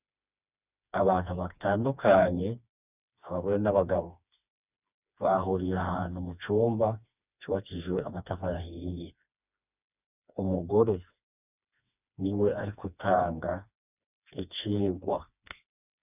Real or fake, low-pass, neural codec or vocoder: fake; 3.6 kHz; codec, 16 kHz, 2 kbps, FreqCodec, smaller model